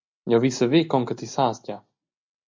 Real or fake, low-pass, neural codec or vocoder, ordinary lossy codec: real; 7.2 kHz; none; MP3, 64 kbps